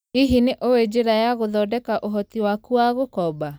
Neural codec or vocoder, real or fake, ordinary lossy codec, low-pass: none; real; none; none